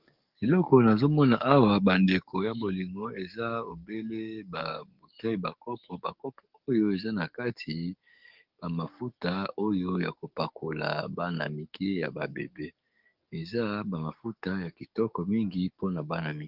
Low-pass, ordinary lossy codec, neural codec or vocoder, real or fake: 5.4 kHz; Opus, 32 kbps; codec, 44.1 kHz, 7.8 kbps, DAC; fake